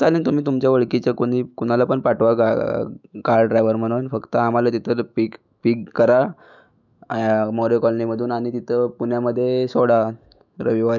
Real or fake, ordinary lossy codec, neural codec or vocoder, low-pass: fake; none; autoencoder, 48 kHz, 128 numbers a frame, DAC-VAE, trained on Japanese speech; 7.2 kHz